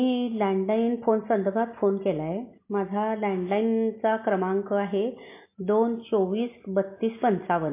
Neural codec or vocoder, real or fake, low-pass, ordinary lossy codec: none; real; 3.6 kHz; MP3, 24 kbps